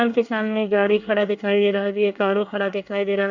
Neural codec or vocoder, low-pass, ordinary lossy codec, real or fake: codec, 24 kHz, 1 kbps, SNAC; 7.2 kHz; none; fake